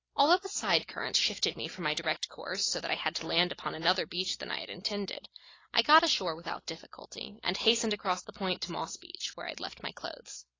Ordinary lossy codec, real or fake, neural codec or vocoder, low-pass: AAC, 32 kbps; real; none; 7.2 kHz